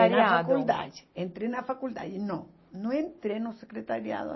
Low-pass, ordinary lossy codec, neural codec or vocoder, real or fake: 7.2 kHz; MP3, 24 kbps; none; real